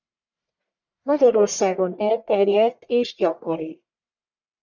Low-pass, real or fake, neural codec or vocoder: 7.2 kHz; fake; codec, 44.1 kHz, 1.7 kbps, Pupu-Codec